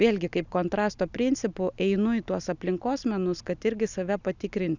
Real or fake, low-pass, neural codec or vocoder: real; 7.2 kHz; none